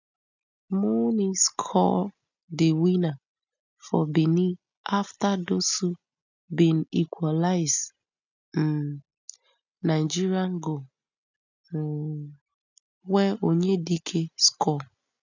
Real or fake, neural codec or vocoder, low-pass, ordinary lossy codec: real; none; 7.2 kHz; none